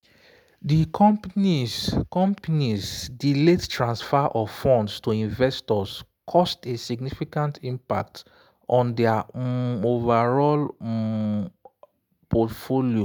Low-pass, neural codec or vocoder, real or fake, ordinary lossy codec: none; none; real; none